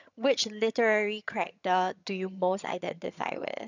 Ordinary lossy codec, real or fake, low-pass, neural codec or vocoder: none; fake; 7.2 kHz; vocoder, 22.05 kHz, 80 mel bands, HiFi-GAN